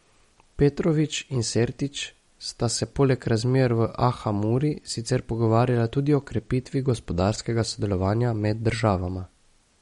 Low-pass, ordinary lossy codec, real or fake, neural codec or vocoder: 19.8 kHz; MP3, 48 kbps; real; none